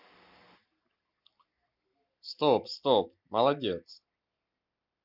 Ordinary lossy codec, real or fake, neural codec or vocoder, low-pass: Opus, 64 kbps; real; none; 5.4 kHz